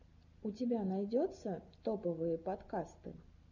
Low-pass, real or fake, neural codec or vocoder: 7.2 kHz; real; none